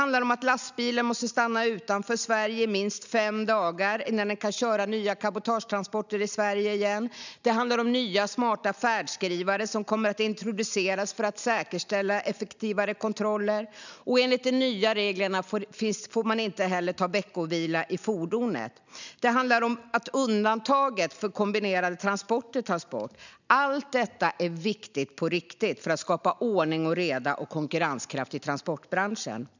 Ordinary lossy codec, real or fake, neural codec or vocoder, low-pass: none; real; none; 7.2 kHz